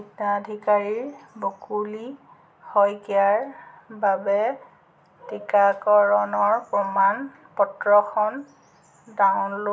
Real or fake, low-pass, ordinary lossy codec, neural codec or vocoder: real; none; none; none